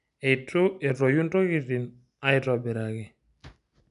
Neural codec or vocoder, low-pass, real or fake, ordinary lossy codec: none; 10.8 kHz; real; none